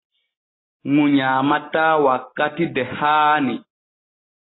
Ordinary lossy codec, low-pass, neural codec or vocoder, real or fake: AAC, 16 kbps; 7.2 kHz; none; real